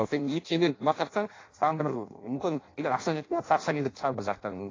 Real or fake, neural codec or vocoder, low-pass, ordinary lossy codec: fake; codec, 16 kHz in and 24 kHz out, 0.6 kbps, FireRedTTS-2 codec; 7.2 kHz; AAC, 32 kbps